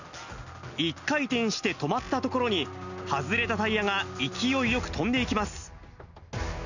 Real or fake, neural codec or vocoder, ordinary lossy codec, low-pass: real; none; none; 7.2 kHz